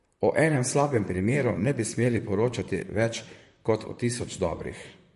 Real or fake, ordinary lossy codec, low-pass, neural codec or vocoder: fake; MP3, 48 kbps; 14.4 kHz; vocoder, 44.1 kHz, 128 mel bands, Pupu-Vocoder